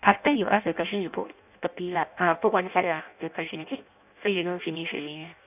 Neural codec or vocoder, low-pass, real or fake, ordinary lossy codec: codec, 16 kHz in and 24 kHz out, 0.6 kbps, FireRedTTS-2 codec; 3.6 kHz; fake; none